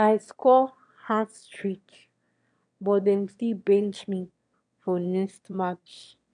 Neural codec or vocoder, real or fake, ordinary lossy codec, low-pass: autoencoder, 22.05 kHz, a latent of 192 numbers a frame, VITS, trained on one speaker; fake; none; 9.9 kHz